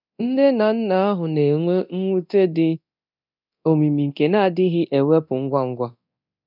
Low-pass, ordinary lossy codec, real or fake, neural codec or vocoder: 5.4 kHz; none; fake; codec, 24 kHz, 0.9 kbps, DualCodec